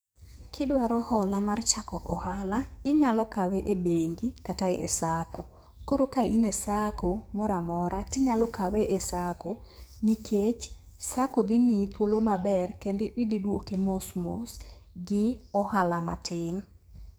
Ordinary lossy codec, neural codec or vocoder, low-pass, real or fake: none; codec, 44.1 kHz, 2.6 kbps, SNAC; none; fake